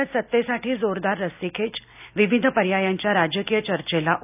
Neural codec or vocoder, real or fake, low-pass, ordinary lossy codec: none; real; 3.6 kHz; none